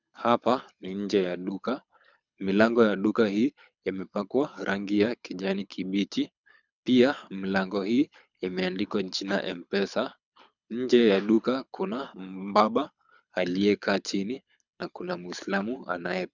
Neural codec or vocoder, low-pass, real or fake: codec, 24 kHz, 6 kbps, HILCodec; 7.2 kHz; fake